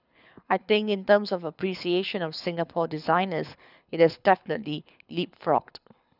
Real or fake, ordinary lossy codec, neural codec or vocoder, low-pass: fake; none; codec, 24 kHz, 6 kbps, HILCodec; 5.4 kHz